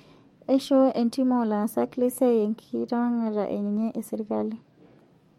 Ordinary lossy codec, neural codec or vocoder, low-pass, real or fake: MP3, 64 kbps; codec, 44.1 kHz, 7.8 kbps, DAC; 19.8 kHz; fake